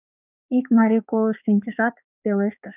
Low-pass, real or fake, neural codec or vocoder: 3.6 kHz; fake; codec, 16 kHz, 4 kbps, X-Codec, HuBERT features, trained on balanced general audio